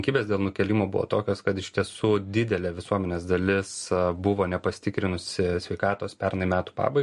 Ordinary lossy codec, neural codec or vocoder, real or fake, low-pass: MP3, 48 kbps; none; real; 14.4 kHz